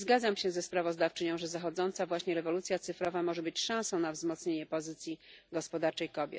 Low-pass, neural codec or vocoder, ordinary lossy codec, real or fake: none; none; none; real